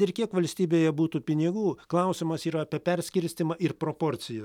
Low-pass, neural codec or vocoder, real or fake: 19.8 kHz; none; real